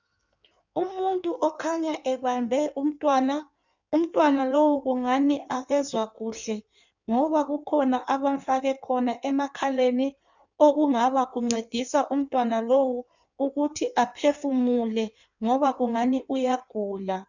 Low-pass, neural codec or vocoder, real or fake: 7.2 kHz; codec, 16 kHz in and 24 kHz out, 1.1 kbps, FireRedTTS-2 codec; fake